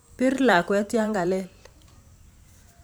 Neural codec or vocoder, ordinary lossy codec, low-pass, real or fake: vocoder, 44.1 kHz, 128 mel bands every 512 samples, BigVGAN v2; none; none; fake